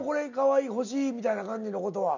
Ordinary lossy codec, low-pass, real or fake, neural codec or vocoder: none; 7.2 kHz; real; none